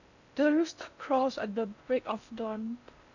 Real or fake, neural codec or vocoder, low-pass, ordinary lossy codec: fake; codec, 16 kHz in and 24 kHz out, 0.6 kbps, FocalCodec, streaming, 4096 codes; 7.2 kHz; none